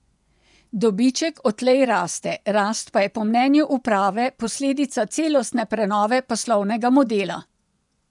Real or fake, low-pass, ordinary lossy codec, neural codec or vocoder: fake; 10.8 kHz; none; vocoder, 24 kHz, 100 mel bands, Vocos